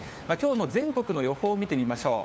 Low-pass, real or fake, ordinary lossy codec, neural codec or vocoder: none; fake; none; codec, 16 kHz, 4 kbps, FunCodec, trained on LibriTTS, 50 frames a second